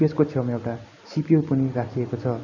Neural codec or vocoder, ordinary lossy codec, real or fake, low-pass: none; none; real; 7.2 kHz